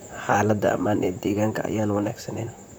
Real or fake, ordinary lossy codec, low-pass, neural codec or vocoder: fake; none; none; vocoder, 44.1 kHz, 128 mel bands, Pupu-Vocoder